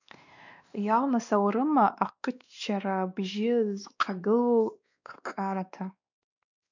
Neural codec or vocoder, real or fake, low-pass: codec, 16 kHz, 2 kbps, X-Codec, WavLM features, trained on Multilingual LibriSpeech; fake; 7.2 kHz